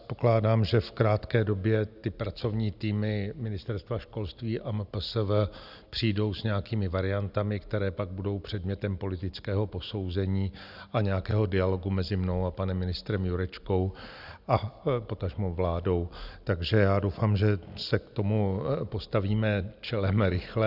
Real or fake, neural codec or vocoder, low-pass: real; none; 5.4 kHz